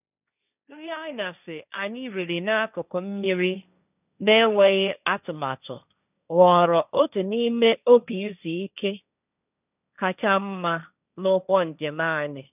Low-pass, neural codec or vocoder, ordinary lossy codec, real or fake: 3.6 kHz; codec, 16 kHz, 1.1 kbps, Voila-Tokenizer; none; fake